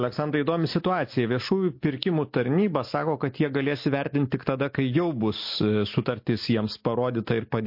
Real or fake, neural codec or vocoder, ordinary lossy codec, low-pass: real; none; MP3, 32 kbps; 5.4 kHz